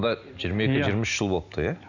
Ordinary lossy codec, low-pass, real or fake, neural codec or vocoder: none; 7.2 kHz; real; none